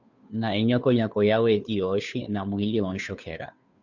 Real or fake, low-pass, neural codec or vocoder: fake; 7.2 kHz; codec, 16 kHz, 2 kbps, FunCodec, trained on Chinese and English, 25 frames a second